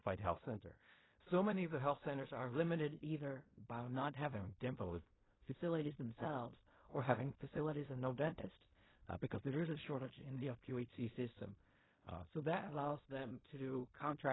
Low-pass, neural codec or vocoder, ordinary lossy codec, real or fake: 7.2 kHz; codec, 16 kHz in and 24 kHz out, 0.4 kbps, LongCat-Audio-Codec, fine tuned four codebook decoder; AAC, 16 kbps; fake